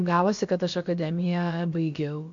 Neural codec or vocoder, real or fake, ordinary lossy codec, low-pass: codec, 16 kHz, about 1 kbps, DyCAST, with the encoder's durations; fake; MP3, 48 kbps; 7.2 kHz